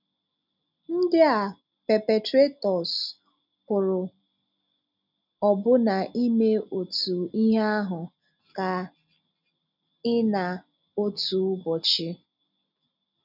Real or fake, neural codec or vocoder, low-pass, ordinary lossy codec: real; none; 5.4 kHz; none